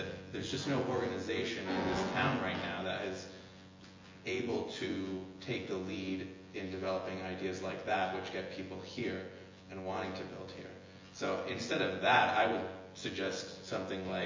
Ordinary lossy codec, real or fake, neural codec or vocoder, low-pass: MP3, 32 kbps; fake; vocoder, 24 kHz, 100 mel bands, Vocos; 7.2 kHz